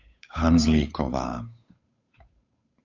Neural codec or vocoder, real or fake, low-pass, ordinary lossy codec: codec, 16 kHz, 8 kbps, FunCodec, trained on Chinese and English, 25 frames a second; fake; 7.2 kHz; AAC, 48 kbps